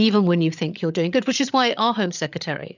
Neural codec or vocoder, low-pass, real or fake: codec, 16 kHz, 8 kbps, FreqCodec, larger model; 7.2 kHz; fake